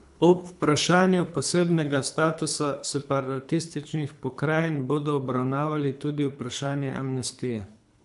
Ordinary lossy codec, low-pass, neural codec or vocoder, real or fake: AAC, 96 kbps; 10.8 kHz; codec, 24 kHz, 3 kbps, HILCodec; fake